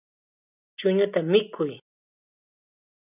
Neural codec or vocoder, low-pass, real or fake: none; 3.6 kHz; real